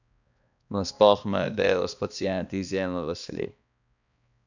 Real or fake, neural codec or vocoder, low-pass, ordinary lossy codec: fake; codec, 16 kHz, 2 kbps, X-Codec, HuBERT features, trained on balanced general audio; 7.2 kHz; none